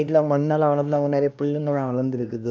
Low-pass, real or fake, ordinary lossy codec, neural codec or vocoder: none; fake; none; codec, 16 kHz, 1 kbps, X-Codec, HuBERT features, trained on LibriSpeech